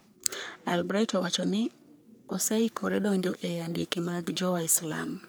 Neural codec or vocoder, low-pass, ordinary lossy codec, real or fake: codec, 44.1 kHz, 3.4 kbps, Pupu-Codec; none; none; fake